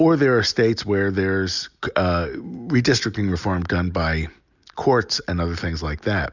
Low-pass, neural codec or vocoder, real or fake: 7.2 kHz; none; real